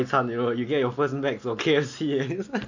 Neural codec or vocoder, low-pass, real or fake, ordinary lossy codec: none; 7.2 kHz; real; none